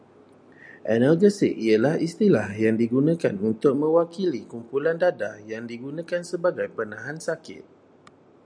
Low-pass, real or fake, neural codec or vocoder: 9.9 kHz; real; none